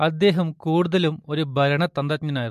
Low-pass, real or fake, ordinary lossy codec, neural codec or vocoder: 14.4 kHz; real; MP3, 64 kbps; none